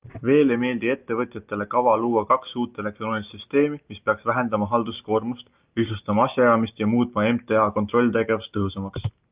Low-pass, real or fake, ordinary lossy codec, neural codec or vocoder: 3.6 kHz; real; Opus, 24 kbps; none